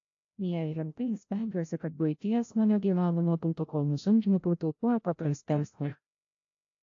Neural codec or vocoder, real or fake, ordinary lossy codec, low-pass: codec, 16 kHz, 0.5 kbps, FreqCodec, larger model; fake; AAC, 48 kbps; 7.2 kHz